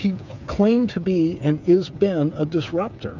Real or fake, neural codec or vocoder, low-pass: fake; codec, 16 kHz, 8 kbps, FreqCodec, smaller model; 7.2 kHz